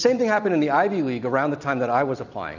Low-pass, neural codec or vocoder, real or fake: 7.2 kHz; none; real